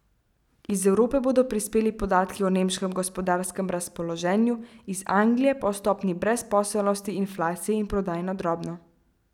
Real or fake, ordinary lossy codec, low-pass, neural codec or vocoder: real; none; 19.8 kHz; none